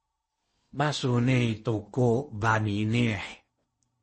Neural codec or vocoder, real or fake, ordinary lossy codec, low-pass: codec, 16 kHz in and 24 kHz out, 0.8 kbps, FocalCodec, streaming, 65536 codes; fake; MP3, 32 kbps; 10.8 kHz